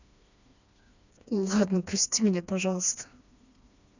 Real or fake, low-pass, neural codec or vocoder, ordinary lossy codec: fake; 7.2 kHz; codec, 16 kHz, 2 kbps, FreqCodec, smaller model; none